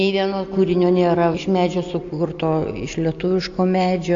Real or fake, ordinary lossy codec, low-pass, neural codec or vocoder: real; AAC, 64 kbps; 7.2 kHz; none